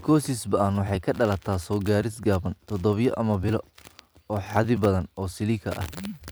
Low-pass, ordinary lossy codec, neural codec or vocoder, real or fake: none; none; vocoder, 44.1 kHz, 128 mel bands every 256 samples, BigVGAN v2; fake